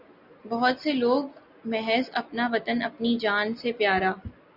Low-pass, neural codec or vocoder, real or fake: 5.4 kHz; none; real